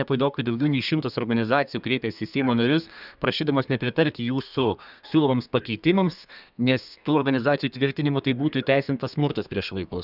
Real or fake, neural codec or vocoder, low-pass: fake; codec, 32 kHz, 1.9 kbps, SNAC; 5.4 kHz